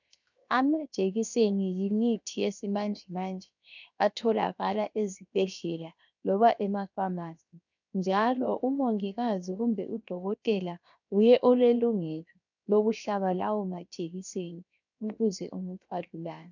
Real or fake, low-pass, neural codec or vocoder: fake; 7.2 kHz; codec, 16 kHz, 0.7 kbps, FocalCodec